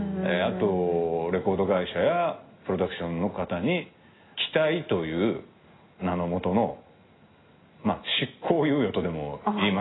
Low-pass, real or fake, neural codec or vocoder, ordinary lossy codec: 7.2 kHz; real; none; AAC, 16 kbps